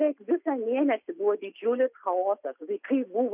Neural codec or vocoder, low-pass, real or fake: none; 3.6 kHz; real